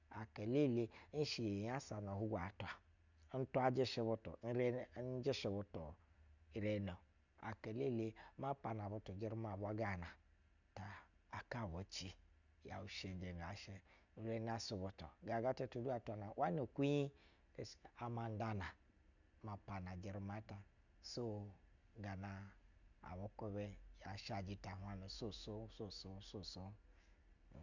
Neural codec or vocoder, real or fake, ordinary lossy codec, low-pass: none; real; none; 7.2 kHz